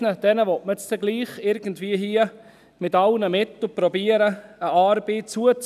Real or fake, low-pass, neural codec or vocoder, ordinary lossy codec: real; 14.4 kHz; none; none